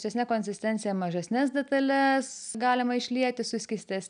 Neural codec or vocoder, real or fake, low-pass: none; real; 9.9 kHz